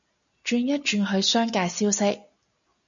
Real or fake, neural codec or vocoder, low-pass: real; none; 7.2 kHz